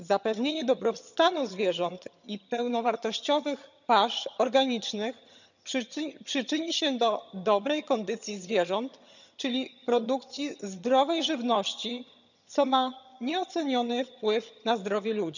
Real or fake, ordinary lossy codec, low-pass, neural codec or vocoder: fake; none; 7.2 kHz; vocoder, 22.05 kHz, 80 mel bands, HiFi-GAN